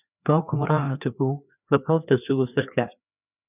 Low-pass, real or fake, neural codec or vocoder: 3.6 kHz; fake; codec, 16 kHz, 2 kbps, FreqCodec, larger model